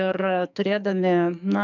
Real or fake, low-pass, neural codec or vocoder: fake; 7.2 kHz; codec, 44.1 kHz, 2.6 kbps, SNAC